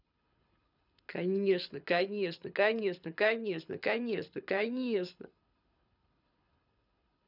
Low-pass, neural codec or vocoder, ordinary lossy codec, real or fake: 5.4 kHz; codec, 24 kHz, 6 kbps, HILCodec; none; fake